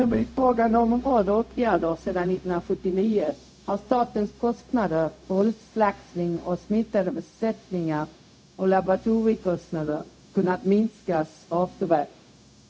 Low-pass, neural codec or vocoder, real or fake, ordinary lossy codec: none; codec, 16 kHz, 0.4 kbps, LongCat-Audio-Codec; fake; none